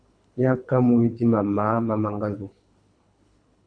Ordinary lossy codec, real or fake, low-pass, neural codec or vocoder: AAC, 64 kbps; fake; 9.9 kHz; codec, 24 kHz, 3 kbps, HILCodec